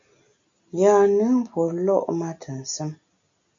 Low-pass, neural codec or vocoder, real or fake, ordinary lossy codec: 7.2 kHz; none; real; AAC, 64 kbps